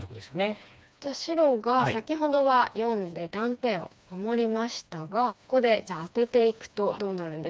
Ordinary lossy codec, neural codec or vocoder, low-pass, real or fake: none; codec, 16 kHz, 2 kbps, FreqCodec, smaller model; none; fake